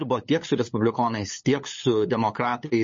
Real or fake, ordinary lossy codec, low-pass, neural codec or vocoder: fake; MP3, 32 kbps; 7.2 kHz; codec, 16 kHz, 8 kbps, FunCodec, trained on LibriTTS, 25 frames a second